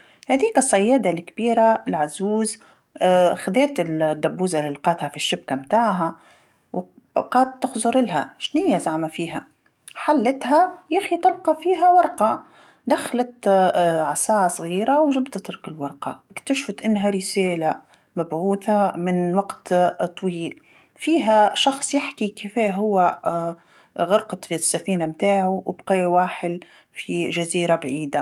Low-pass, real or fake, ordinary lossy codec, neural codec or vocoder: 19.8 kHz; fake; none; codec, 44.1 kHz, 7.8 kbps, DAC